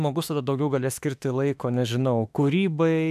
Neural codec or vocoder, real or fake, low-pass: autoencoder, 48 kHz, 32 numbers a frame, DAC-VAE, trained on Japanese speech; fake; 14.4 kHz